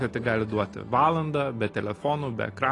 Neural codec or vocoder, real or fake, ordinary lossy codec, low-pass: none; real; AAC, 32 kbps; 10.8 kHz